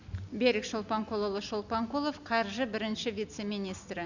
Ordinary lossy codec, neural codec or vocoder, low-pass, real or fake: none; none; 7.2 kHz; real